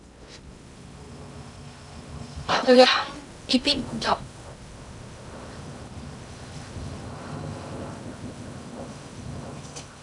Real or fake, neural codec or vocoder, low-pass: fake; codec, 16 kHz in and 24 kHz out, 0.6 kbps, FocalCodec, streaming, 2048 codes; 10.8 kHz